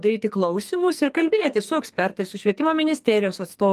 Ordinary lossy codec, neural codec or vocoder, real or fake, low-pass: Opus, 32 kbps; codec, 44.1 kHz, 2.6 kbps, SNAC; fake; 14.4 kHz